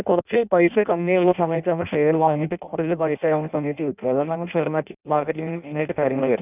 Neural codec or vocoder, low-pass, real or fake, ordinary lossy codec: codec, 16 kHz in and 24 kHz out, 0.6 kbps, FireRedTTS-2 codec; 3.6 kHz; fake; none